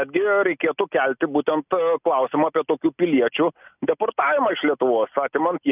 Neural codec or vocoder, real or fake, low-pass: none; real; 3.6 kHz